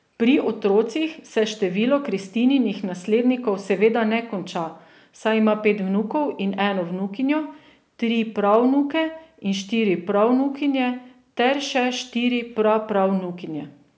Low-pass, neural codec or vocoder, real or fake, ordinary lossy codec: none; none; real; none